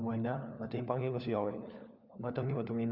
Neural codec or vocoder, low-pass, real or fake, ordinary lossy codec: codec, 16 kHz, 4 kbps, FunCodec, trained on LibriTTS, 50 frames a second; 5.4 kHz; fake; none